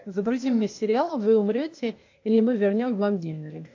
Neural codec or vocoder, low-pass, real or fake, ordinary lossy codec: codec, 16 kHz, 0.8 kbps, ZipCodec; 7.2 kHz; fake; AAC, 48 kbps